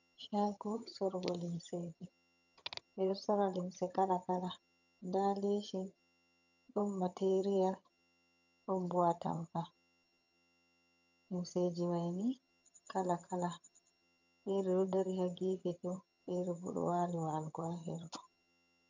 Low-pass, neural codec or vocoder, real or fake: 7.2 kHz; vocoder, 22.05 kHz, 80 mel bands, HiFi-GAN; fake